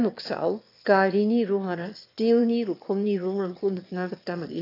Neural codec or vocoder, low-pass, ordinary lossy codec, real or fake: autoencoder, 22.05 kHz, a latent of 192 numbers a frame, VITS, trained on one speaker; 5.4 kHz; AAC, 32 kbps; fake